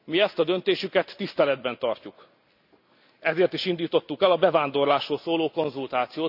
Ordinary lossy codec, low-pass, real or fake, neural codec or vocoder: none; 5.4 kHz; real; none